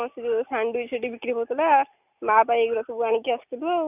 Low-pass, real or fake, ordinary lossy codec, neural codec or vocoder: 3.6 kHz; real; none; none